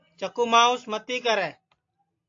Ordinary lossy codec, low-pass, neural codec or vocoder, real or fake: AAC, 48 kbps; 7.2 kHz; none; real